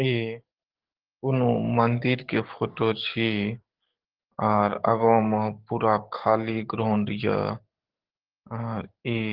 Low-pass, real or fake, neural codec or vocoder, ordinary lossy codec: 5.4 kHz; fake; codec, 44.1 kHz, 7.8 kbps, DAC; Opus, 16 kbps